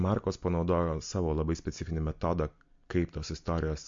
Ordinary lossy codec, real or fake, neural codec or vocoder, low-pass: MP3, 48 kbps; real; none; 7.2 kHz